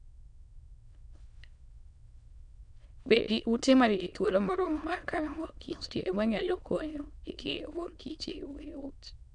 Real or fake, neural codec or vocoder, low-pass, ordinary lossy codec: fake; autoencoder, 22.05 kHz, a latent of 192 numbers a frame, VITS, trained on many speakers; 9.9 kHz; none